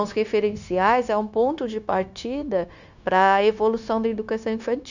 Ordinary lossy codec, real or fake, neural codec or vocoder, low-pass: none; fake; codec, 16 kHz, 0.9 kbps, LongCat-Audio-Codec; 7.2 kHz